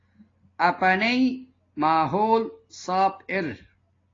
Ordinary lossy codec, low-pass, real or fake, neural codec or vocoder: AAC, 32 kbps; 7.2 kHz; real; none